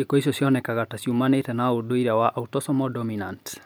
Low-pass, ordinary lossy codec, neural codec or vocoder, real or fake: none; none; none; real